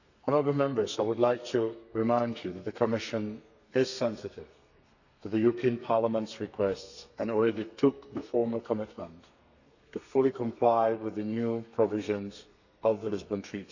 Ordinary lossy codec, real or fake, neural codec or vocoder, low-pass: none; fake; codec, 32 kHz, 1.9 kbps, SNAC; 7.2 kHz